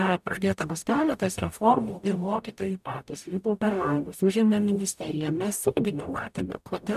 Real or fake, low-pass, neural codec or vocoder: fake; 14.4 kHz; codec, 44.1 kHz, 0.9 kbps, DAC